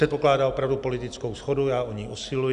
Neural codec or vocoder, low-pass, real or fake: none; 10.8 kHz; real